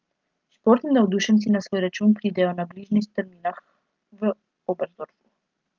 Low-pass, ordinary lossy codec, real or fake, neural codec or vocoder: 7.2 kHz; Opus, 32 kbps; real; none